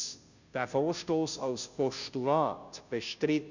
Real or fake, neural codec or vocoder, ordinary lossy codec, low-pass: fake; codec, 16 kHz, 0.5 kbps, FunCodec, trained on Chinese and English, 25 frames a second; none; 7.2 kHz